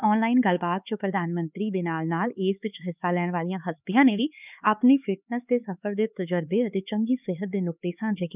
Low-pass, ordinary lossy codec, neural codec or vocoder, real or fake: 3.6 kHz; none; codec, 16 kHz, 4 kbps, X-Codec, HuBERT features, trained on LibriSpeech; fake